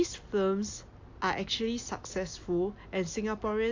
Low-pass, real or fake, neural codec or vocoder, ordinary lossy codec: 7.2 kHz; real; none; AAC, 48 kbps